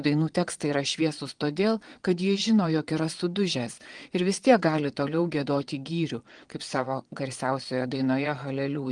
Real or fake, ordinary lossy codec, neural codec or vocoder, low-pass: fake; Opus, 24 kbps; vocoder, 22.05 kHz, 80 mel bands, Vocos; 9.9 kHz